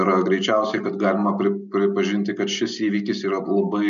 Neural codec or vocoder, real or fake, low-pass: none; real; 7.2 kHz